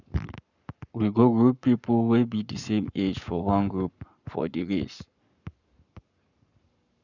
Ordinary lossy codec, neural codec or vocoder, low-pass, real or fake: none; codec, 44.1 kHz, 7.8 kbps, Pupu-Codec; 7.2 kHz; fake